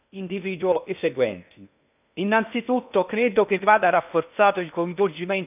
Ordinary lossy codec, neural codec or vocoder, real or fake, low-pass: none; codec, 16 kHz in and 24 kHz out, 0.6 kbps, FocalCodec, streaming, 2048 codes; fake; 3.6 kHz